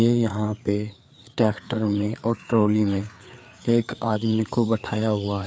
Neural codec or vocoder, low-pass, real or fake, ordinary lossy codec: codec, 16 kHz, 4 kbps, FreqCodec, larger model; none; fake; none